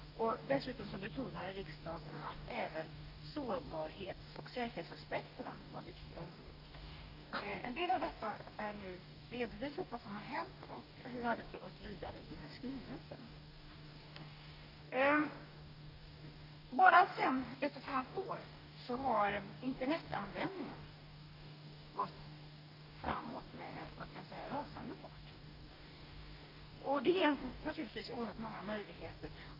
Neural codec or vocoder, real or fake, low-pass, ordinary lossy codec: codec, 44.1 kHz, 2.6 kbps, DAC; fake; 5.4 kHz; none